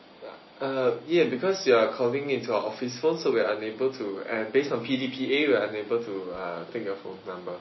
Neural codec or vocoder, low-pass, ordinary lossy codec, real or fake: none; 7.2 kHz; MP3, 24 kbps; real